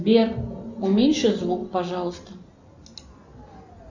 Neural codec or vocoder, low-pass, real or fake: none; 7.2 kHz; real